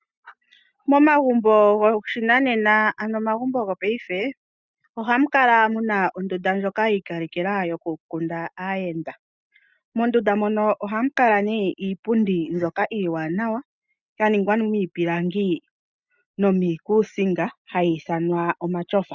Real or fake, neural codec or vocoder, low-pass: real; none; 7.2 kHz